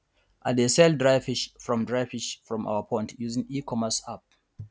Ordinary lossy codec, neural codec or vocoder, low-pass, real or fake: none; none; none; real